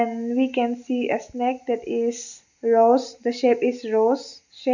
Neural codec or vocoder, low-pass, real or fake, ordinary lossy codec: none; 7.2 kHz; real; none